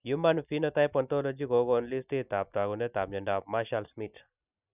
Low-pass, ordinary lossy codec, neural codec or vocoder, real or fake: 3.6 kHz; none; none; real